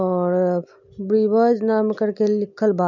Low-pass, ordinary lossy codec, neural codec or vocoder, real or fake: 7.2 kHz; none; none; real